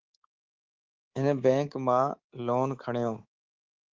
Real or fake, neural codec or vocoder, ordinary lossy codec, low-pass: fake; codec, 24 kHz, 3.1 kbps, DualCodec; Opus, 16 kbps; 7.2 kHz